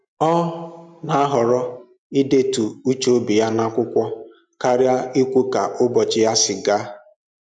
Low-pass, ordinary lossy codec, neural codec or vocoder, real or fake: 9.9 kHz; MP3, 96 kbps; none; real